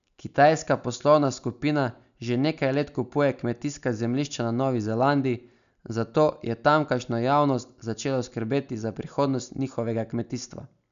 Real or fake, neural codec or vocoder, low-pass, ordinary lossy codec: real; none; 7.2 kHz; none